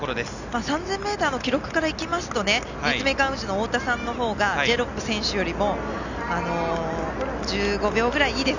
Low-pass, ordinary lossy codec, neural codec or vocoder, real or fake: 7.2 kHz; none; none; real